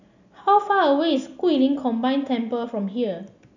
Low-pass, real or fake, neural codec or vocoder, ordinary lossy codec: 7.2 kHz; real; none; none